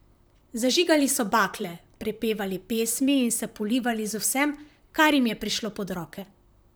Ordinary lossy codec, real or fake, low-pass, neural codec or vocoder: none; fake; none; vocoder, 44.1 kHz, 128 mel bands, Pupu-Vocoder